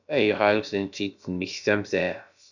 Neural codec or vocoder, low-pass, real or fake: codec, 16 kHz, about 1 kbps, DyCAST, with the encoder's durations; 7.2 kHz; fake